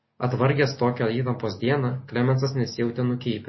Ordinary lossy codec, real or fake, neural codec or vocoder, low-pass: MP3, 24 kbps; real; none; 7.2 kHz